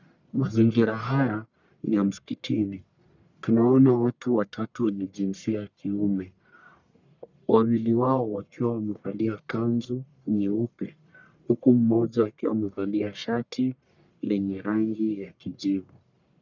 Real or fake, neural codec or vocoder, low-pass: fake; codec, 44.1 kHz, 1.7 kbps, Pupu-Codec; 7.2 kHz